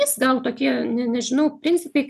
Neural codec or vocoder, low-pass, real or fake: none; 14.4 kHz; real